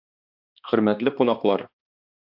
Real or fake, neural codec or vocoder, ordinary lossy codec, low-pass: fake; codec, 16 kHz, 2 kbps, X-Codec, HuBERT features, trained on balanced general audio; AAC, 48 kbps; 5.4 kHz